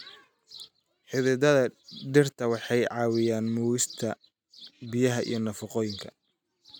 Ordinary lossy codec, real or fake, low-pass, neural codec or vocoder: none; real; none; none